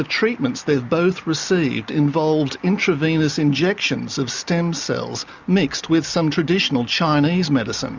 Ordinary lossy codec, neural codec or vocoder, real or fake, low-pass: Opus, 64 kbps; none; real; 7.2 kHz